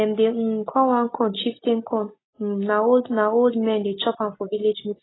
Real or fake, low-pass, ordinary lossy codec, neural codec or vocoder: real; 7.2 kHz; AAC, 16 kbps; none